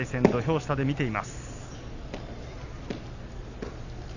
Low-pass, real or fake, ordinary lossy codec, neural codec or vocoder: 7.2 kHz; real; none; none